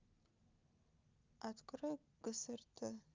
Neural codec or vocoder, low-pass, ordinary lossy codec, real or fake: none; 7.2 kHz; Opus, 24 kbps; real